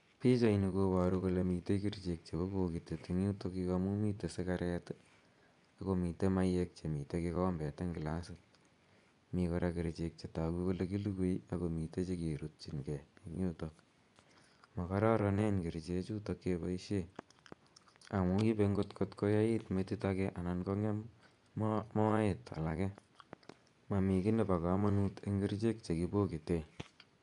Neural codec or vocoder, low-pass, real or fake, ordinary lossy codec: vocoder, 24 kHz, 100 mel bands, Vocos; 10.8 kHz; fake; none